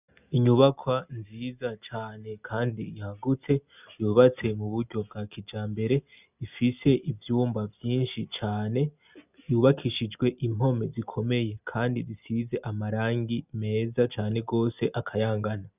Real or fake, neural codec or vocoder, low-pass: real; none; 3.6 kHz